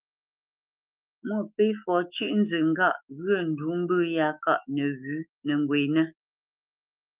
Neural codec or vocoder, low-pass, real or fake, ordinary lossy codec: autoencoder, 48 kHz, 128 numbers a frame, DAC-VAE, trained on Japanese speech; 3.6 kHz; fake; Opus, 24 kbps